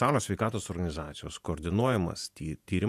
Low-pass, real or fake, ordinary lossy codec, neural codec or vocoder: 14.4 kHz; fake; AAC, 96 kbps; vocoder, 48 kHz, 128 mel bands, Vocos